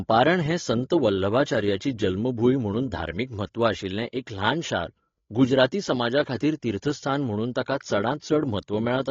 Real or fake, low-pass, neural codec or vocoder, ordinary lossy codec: fake; 7.2 kHz; codec, 16 kHz, 16 kbps, FreqCodec, larger model; AAC, 32 kbps